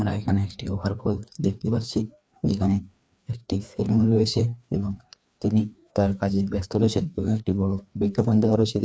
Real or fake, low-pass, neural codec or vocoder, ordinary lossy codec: fake; none; codec, 16 kHz, 2 kbps, FreqCodec, larger model; none